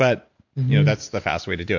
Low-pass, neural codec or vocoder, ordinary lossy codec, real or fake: 7.2 kHz; none; MP3, 48 kbps; real